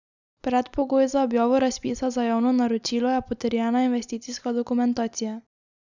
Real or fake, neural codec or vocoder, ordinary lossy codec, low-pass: real; none; none; 7.2 kHz